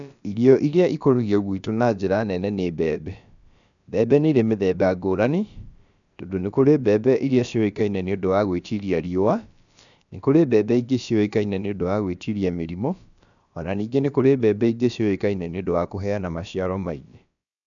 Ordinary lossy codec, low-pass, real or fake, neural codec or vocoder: none; 7.2 kHz; fake; codec, 16 kHz, about 1 kbps, DyCAST, with the encoder's durations